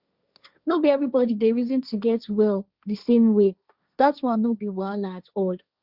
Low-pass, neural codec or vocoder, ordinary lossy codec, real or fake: 5.4 kHz; codec, 16 kHz, 1.1 kbps, Voila-Tokenizer; Opus, 64 kbps; fake